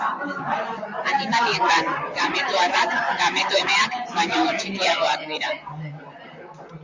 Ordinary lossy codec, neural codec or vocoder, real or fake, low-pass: MP3, 64 kbps; vocoder, 44.1 kHz, 128 mel bands, Pupu-Vocoder; fake; 7.2 kHz